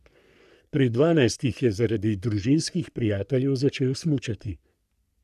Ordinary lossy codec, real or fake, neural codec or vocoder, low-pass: none; fake; codec, 44.1 kHz, 3.4 kbps, Pupu-Codec; 14.4 kHz